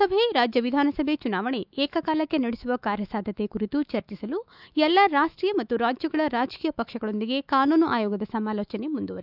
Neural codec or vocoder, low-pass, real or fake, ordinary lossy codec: autoencoder, 48 kHz, 128 numbers a frame, DAC-VAE, trained on Japanese speech; 5.4 kHz; fake; none